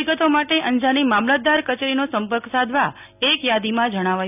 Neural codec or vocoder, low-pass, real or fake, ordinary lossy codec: none; 3.6 kHz; real; none